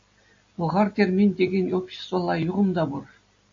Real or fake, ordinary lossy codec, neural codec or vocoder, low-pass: real; AAC, 48 kbps; none; 7.2 kHz